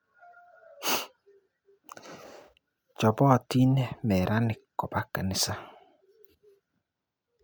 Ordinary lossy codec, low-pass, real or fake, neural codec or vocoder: none; none; real; none